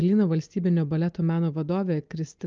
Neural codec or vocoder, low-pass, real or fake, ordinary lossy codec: none; 7.2 kHz; real; Opus, 24 kbps